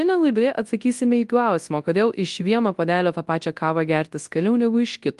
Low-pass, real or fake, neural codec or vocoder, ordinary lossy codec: 10.8 kHz; fake; codec, 24 kHz, 0.9 kbps, WavTokenizer, large speech release; Opus, 24 kbps